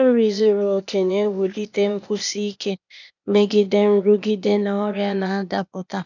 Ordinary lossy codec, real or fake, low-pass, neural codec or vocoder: none; fake; 7.2 kHz; codec, 16 kHz, 0.8 kbps, ZipCodec